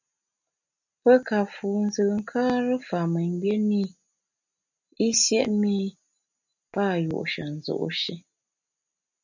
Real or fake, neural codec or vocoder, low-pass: real; none; 7.2 kHz